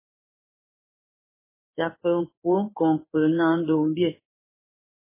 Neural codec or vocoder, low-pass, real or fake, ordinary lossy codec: codec, 16 kHz in and 24 kHz out, 1 kbps, XY-Tokenizer; 3.6 kHz; fake; MP3, 16 kbps